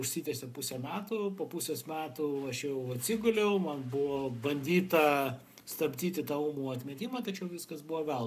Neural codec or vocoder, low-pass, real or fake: codec, 44.1 kHz, 7.8 kbps, Pupu-Codec; 14.4 kHz; fake